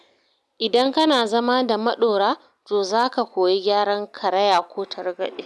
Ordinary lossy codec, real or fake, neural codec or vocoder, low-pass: none; real; none; none